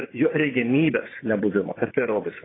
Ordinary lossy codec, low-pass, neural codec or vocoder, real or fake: AAC, 16 kbps; 7.2 kHz; vocoder, 24 kHz, 100 mel bands, Vocos; fake